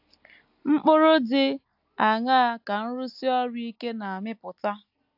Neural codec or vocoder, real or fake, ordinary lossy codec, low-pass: none; real; AAC, 48 kbps; 5.4 kHz